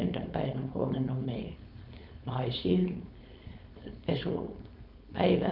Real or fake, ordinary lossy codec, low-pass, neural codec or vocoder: fake; none; 5.4 kHz; codec, 16 kHz, 4.8 kbps, FACodec